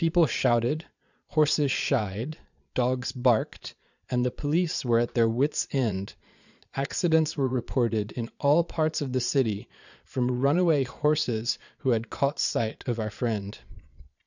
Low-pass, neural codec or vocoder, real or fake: 7.2 kHz; vocoder, 22.05 kHz, 80 mel bands, Vocos; fake